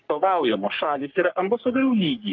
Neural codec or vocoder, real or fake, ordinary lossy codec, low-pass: codec, 44.1 kHz, 3.4 kbps, Pupu-Codec; fake; Opus, 32 kbps; 7.2 kHz